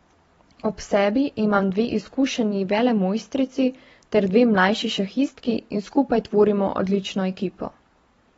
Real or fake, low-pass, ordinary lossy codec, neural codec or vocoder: fake; 19.8 kHz; AAC, 24 kbps; vocoder, 44.1 kHz, 128 mel bands every 256 samples, BigVGAN v2